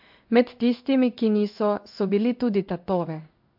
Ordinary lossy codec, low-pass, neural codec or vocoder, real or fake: MP3, 48 kbps; 5.4 kHz; codec, 16 kHz in and 24 kHz out, 1 kbps, XY-Tokenizer; fake